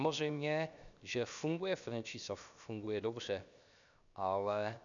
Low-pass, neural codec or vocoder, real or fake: 7.2 kHz; codec, 16 kHz, 0.7 kbps, FocalCodec; fake